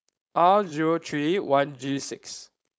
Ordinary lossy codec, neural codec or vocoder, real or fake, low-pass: none; codec, 16 kHz, 4.8 kbps, FACodec; fake; none